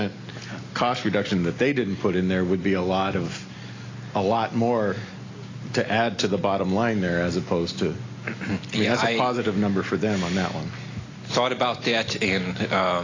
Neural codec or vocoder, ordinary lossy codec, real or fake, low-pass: none; AAC, 32 kbps; real; 7.2 kHz